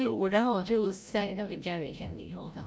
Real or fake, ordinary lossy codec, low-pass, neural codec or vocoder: fake; none; none; codec, 16 kHz, 0.5 kbps, FreqCodec, larger model